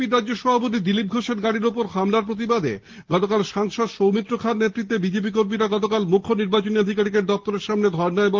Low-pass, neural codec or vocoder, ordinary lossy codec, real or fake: 7.2 kHz; none; Opus, 16 kbps; real